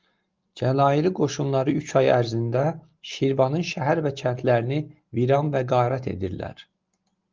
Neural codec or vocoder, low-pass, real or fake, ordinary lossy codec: none; 7.2 kHz; real; Opus, 32 kbps